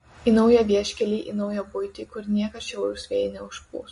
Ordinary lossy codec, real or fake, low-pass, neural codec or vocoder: MP3, 48 kbps; real; 10.8 kHz; none